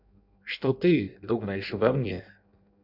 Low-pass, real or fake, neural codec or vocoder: 5.4 kHz; fake; codec, 16 kHz in and 24 kHz out, 0.6 kbps, FireRedTTS-2 codec